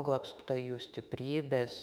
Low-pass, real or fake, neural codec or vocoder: 19.8 kHz; fake; autoencoder, 48 kHz, 32 numbers a frame, DAC-VAE, trained on Japanese speech